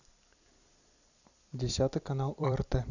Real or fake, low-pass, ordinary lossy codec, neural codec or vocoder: fake; 7.2 kHz; none; vocoder, 44.1 kHz, 128 mel bands every 256 samples, BigVGAN v2